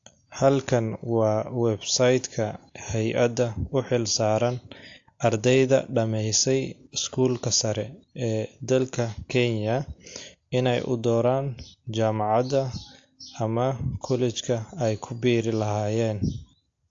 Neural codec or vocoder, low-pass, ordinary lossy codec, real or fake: none; 7.2 kHz; AAC, 48 kbps; real